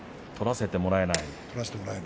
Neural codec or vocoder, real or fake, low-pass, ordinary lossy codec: none; real; none; none